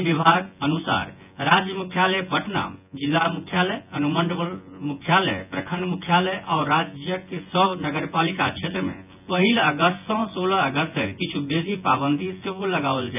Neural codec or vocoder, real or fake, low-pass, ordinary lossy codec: vocoder, 24 kHz, 100 mel bands, Vocos; fake; 3.6 kHz; none